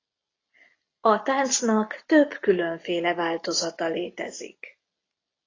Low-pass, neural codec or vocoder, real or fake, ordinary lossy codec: 7.2 kHz; none; real; AAC, 32 kbps